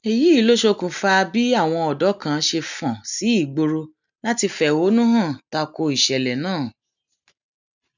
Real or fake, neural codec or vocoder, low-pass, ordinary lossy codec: real; none; 7.2 kHz; none